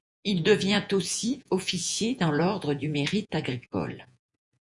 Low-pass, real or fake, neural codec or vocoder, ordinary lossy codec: 10.8 kHz; fake; vocoder, 48 kHz, 128 mel bands, Vocos; MP3, 96 kbps